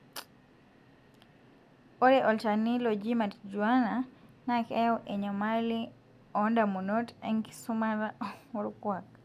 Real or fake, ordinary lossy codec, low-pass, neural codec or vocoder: real; AAC, 96 kbps; 14.4 kHz; none